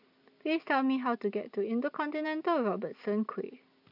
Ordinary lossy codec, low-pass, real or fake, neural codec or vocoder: none; 5.4 kHz; real; none